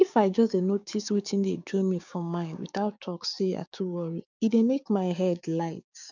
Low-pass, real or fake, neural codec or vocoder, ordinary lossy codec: 7.2 kHz; fake; codec, 16 kHz, 6 kbps, DAC; none